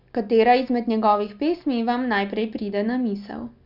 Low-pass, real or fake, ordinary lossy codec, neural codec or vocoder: 5.4 kHz; real; none; none